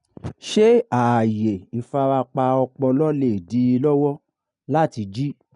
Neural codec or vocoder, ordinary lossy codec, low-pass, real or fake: none; none; 10.8 kHz; real